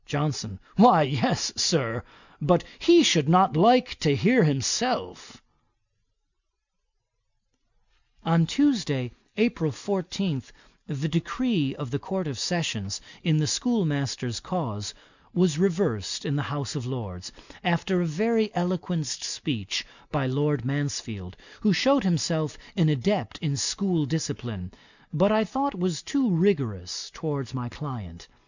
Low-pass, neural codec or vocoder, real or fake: 7.2 kHz; none; real